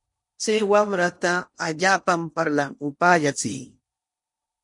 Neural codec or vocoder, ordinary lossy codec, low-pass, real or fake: codec, 16 kHz in and 24 kHz out, 0.8 kbps, FocalCodec, streaming, 65536 codes; MP3, 48 kbps; 10.8 kHz; fake